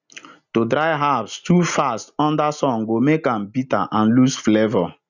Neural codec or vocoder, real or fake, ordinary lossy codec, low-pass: none; real; none; 7.2 kHz